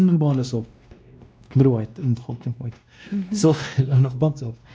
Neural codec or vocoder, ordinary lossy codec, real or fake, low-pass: codec, 16 kHz, 1 kbps, X-Codec, WavLM features, trained on Multilingual LibriSpeech; none; fake; none